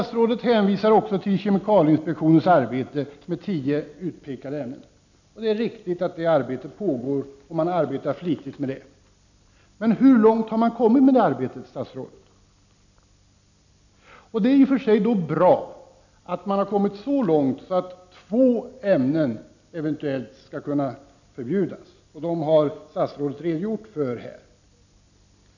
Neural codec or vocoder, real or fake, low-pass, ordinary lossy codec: none; real; 7.2 kHz; none